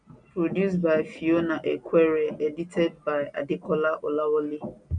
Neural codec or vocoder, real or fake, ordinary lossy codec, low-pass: none; real; none; 9.9 kHz